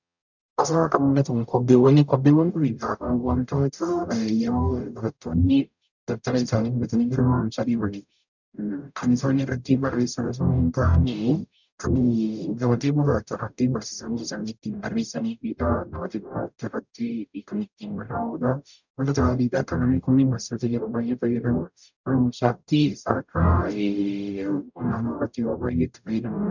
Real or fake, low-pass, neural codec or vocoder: fake; 7.2 kHz; codec, 44.1 kHz, 0.9 kbps, DAC